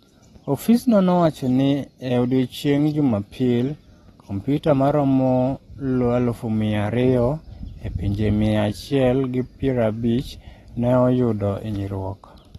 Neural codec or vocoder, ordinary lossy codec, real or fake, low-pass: none; AAC, 32 kbps; real; 19.8 kHz